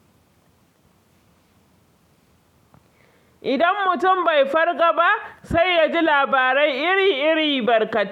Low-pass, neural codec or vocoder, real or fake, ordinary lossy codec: 19.8 kHz; none; real; none